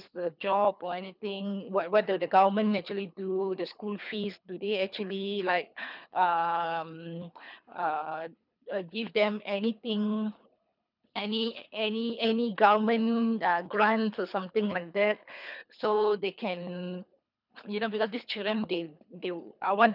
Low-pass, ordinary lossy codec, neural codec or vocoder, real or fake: 5.4 kHz; none; codec, 24 kHz, 3 kbps, HILCodec; fake